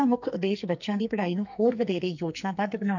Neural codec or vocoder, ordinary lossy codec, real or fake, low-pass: codec, 44.1 kHz, 2.6 kbps, SNAC; none; fake; 7.2 kHz